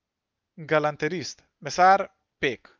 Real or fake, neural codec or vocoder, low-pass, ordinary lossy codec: real; none; 7.2 kHz; Opus, 24 kbps